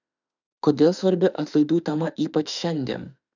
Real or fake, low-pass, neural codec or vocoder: fake; 7.2 kHz; autoencoder, 48 kHz, 32 numbers a frame, DAC-VAE, trained on Japanese speech